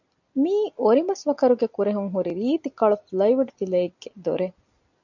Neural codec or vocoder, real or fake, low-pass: none; real; 7.2 kHz